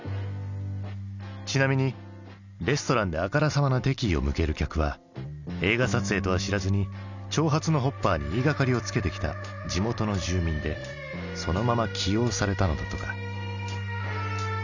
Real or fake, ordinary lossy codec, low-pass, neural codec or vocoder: real; none; 7.2 kHz; none